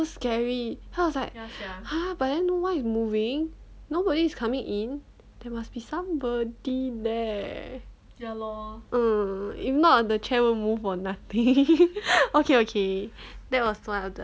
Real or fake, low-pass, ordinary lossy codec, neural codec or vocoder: real; none; none; none